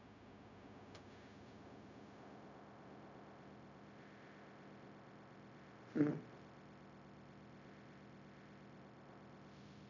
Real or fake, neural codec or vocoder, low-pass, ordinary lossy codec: fake; codec, 16 kHz, 0.4 kbps, LongCat-Audio-Codec; 7.2 kHz; none